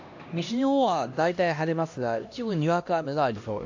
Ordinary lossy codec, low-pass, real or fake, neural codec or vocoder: AAC, 48 kbps; 7.2 kHz; fake; codec, 16 kHz, 1 kbps, X-Codec, HuBERT features, trained on LibriSpeech